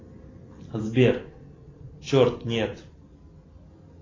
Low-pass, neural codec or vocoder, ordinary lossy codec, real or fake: 7.2 kHz; none; AAC, 32 kbps; real